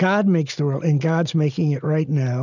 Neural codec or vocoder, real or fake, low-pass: none; real; 7.2 kHz